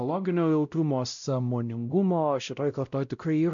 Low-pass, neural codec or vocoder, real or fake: 7.2 kHz; codec, 16 kHz, 0.5 kbps, X-Codec, WavLM features, trained on Multilingual LibriSpeech; fake